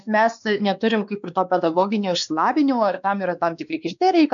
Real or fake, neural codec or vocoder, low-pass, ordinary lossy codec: fake; codec, 16 kHz, 2 kbps, X-Codec, WavLM features, trained on Multilingual LibriSpeech; 7.2 kHz; MP3, 96 kbps